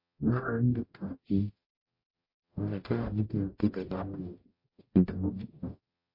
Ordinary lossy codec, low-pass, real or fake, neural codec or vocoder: MP3, 32 kbps; 5.4 kHz; fake; codec, 44.1 kHz, 0.9 kbps, DAC